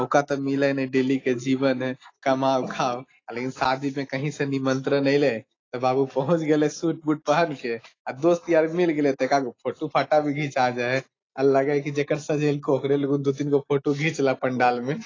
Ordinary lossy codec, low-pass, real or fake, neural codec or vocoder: AAC, 32 kbps; 7.2 kHz; real; none